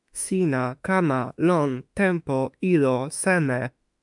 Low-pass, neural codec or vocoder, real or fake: 10.8 kHz; autoencoder, 48 kHz, 32 numbers a frame, DAC-VAE, trained on Japanese speech; fake